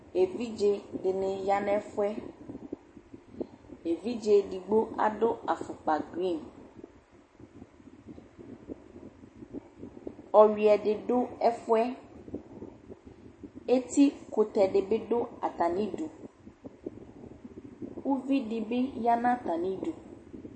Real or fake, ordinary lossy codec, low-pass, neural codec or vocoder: real; MP3, 32 kbps; 9.9 kHz; none